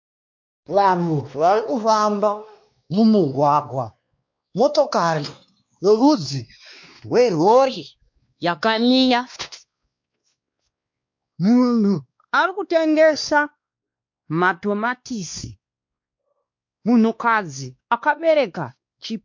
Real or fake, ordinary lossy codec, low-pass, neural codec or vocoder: fake; MP3, 48 kbps; 7.2 kHz; codec, 16 kHz, 2 kbps, X-Codec, WavLM features, trained on Multilingual LibriSpeech